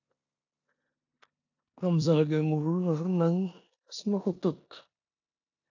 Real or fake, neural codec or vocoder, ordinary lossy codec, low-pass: fake; codec, 16 kHz in and 24 kHz out, 0.9 kbps, LongCat-Audio-Codec, four codebook decoder; AAC, 48 kbps; 7.2 kHz